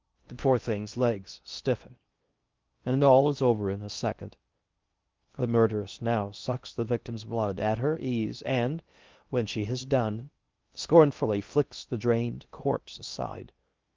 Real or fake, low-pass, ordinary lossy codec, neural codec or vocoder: fake; 7.2 kHz; Opus, 32 kbps; codec, 16 kHz in and 24 kHz out, 0.6 kbps, FocalCodec, streaming, 2048 codes